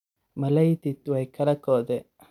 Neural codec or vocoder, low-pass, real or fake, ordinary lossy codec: none; 19.8 kHz; real; none